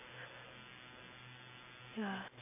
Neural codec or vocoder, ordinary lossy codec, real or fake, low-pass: none; none; real; 3.6 kHz